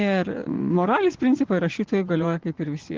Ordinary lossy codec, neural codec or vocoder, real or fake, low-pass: Opus, 16 kbps; vocoder, 24 kHz, 100 mel bands, Vocos; fake; 7.2 kHz